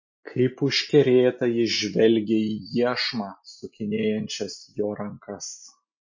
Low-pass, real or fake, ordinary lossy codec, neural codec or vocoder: 7.2 kHz; real; MP3, 32 kbps; none